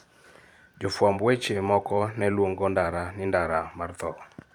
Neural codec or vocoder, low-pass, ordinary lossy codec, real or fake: vocoder, 44.1 kHz, 128 mel bands every 512 samples, BigVGAN v2; 19.8 kHz; none; fake